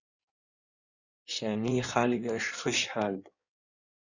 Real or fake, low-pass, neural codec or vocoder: fake; 7.2 kHz; codec, 16 kHz in and 24 kHz out, 1.1 kbps, FireRedTTS-2 codec